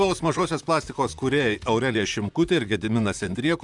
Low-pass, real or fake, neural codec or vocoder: 10.8 kHz; fake; vocoder, 44.1 kHz, 128 mel bands, Pupu-Vocoder